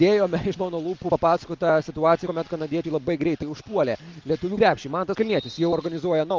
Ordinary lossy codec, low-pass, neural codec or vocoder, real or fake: Opus, 32 kbps; 7.2 kHz; none; real